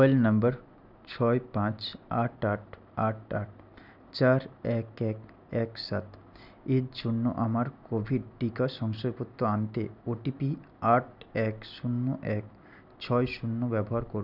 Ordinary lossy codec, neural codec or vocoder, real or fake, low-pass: none; none; real; 5.4 kHz